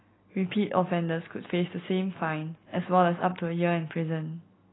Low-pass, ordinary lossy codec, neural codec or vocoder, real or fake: 7.2 kHz; AAC, 16 kbps; none; real